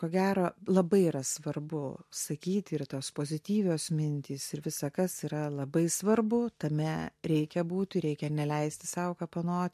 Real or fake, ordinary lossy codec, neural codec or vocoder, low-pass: real; MP3, 64 kbps; none; 14.4 kHz